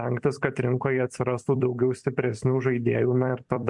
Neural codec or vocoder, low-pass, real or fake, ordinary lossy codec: vocoder, 44.1 kHz, 128 mel bands every 256 samples, BigVGAN v2; 10.8 kHz; fake; MP3, 64 kbps